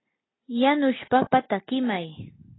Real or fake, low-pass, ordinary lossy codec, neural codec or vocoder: real; 7.2 kHz; AAC, 16 kbps; none